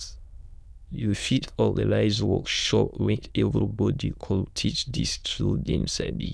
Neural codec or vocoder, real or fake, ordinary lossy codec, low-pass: autoencoder, 22.05 kHz, a latent of 192 numbers a frame, VITS, trained on many speakers; fake; none; none